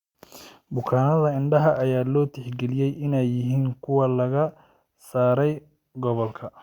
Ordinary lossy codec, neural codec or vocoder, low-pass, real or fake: Opus, 64 kbps; none; 19.8 kHz; real